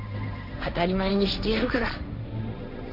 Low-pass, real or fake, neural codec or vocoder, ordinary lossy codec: 5.4 kHz; fake; codec, 16 kHz, 1.1 kbps, Voila-Tokenizer; Opus, 64 kbps